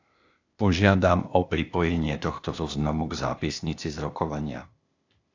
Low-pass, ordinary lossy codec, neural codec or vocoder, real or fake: 7.2 kHz; AAC, 48 kbps; codec, 16 kHz, 0.8 kbps, ZipCodec; fake